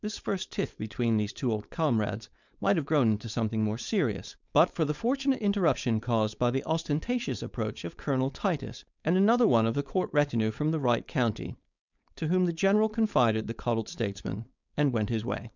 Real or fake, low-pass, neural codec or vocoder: fake; 7.2 kHz; codec, 16 kHz, 4.8 kbps, FACodec